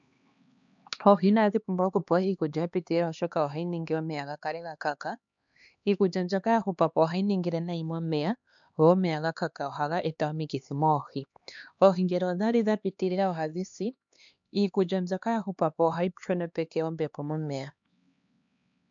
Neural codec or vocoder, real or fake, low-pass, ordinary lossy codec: codec, 16 kHz, 2 kbps, X-Codec, HuBERT features, trained on LibriSpeech; fake; 7.2 kHz; MP3, 64 kbps